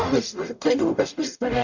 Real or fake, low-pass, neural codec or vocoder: fake; 7.2 kHz; codec, 44.1 kHz, 0.9 kbps, DAC